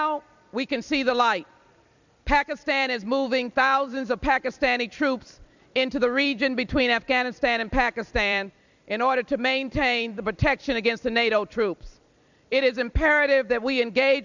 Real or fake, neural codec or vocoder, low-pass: fake; vocoder, 44.1 kHz, 128 mel bands every 256 samples, BigVGAN v2; 7.2 kHz